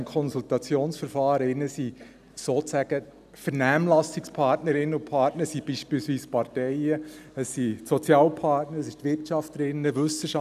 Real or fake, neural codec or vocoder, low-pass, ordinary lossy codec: real; none; 14.4 kHz; none